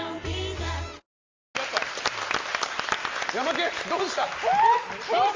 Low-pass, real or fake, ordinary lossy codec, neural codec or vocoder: 7.2 kHz; real; Opus, 32 kbps; none